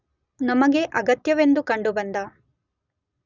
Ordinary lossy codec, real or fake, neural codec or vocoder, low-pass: none; real; none; 7.2 kHz